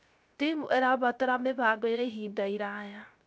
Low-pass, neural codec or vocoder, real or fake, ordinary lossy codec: none; codec, 16 kHz, 0.3 kbps, FocalCodec; fake; none